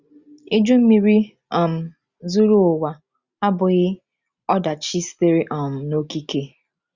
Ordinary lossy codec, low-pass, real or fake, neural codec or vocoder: Opus, 64 kbps; 7.2 kHz; real; none